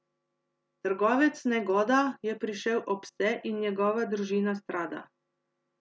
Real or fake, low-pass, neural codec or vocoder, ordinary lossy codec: real; none; none; none